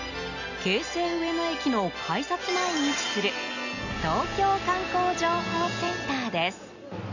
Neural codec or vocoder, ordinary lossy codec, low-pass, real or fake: none; none; 7.2 kHz; real